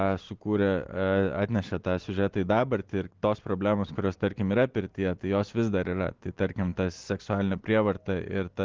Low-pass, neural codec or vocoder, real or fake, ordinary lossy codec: 7.2 kHz; none; real; Opus, 16 kbps